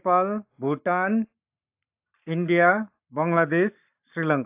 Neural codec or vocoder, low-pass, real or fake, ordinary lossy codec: codec, 44.1 kHz, 7.8 kbps, Pupu-Codec; 3.6 kHz; fake; AAC, 32 kbps